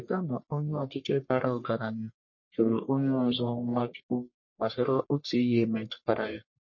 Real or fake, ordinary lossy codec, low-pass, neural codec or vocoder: fake; MP3, 32 kbps; 7.2 kHz; codec, 44.1 kHz, 1.7 kbps, Pupu-Codec